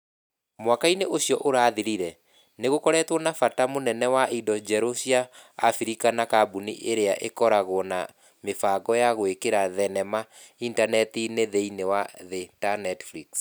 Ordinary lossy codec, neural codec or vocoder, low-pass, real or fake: none; none; none; real